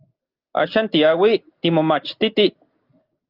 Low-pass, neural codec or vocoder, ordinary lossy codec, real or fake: 5.4 kHz; none; Opus, 32 kbps; real